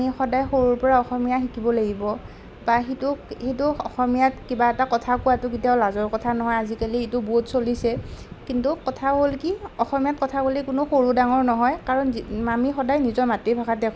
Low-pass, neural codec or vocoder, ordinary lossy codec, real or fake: none; none; none; real